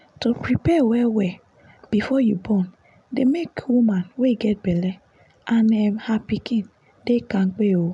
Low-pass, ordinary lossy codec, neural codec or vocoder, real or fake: 10.8 kHz; none; none; real